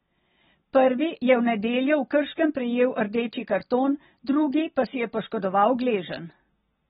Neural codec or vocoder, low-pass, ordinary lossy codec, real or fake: none; 19.8 kHz; AAC, 16 kbps; real